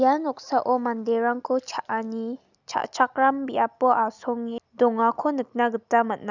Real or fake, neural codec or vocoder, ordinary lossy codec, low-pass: real; none; none; 7.2 kHz